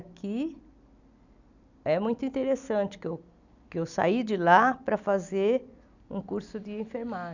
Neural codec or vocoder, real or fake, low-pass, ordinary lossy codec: none; real; 7.2 kHz; none